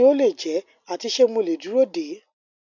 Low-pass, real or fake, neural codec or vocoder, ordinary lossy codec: 7.2 kHz; real; none; none